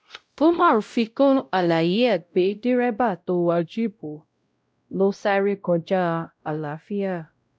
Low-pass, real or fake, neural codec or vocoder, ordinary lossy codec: none; fake; codec, 16 kHz, 0.5 kbps, X-Codec, WavLM features, trained on Multilingual LibriSpeech; none